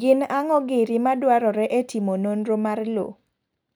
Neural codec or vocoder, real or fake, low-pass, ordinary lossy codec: none; real; none; none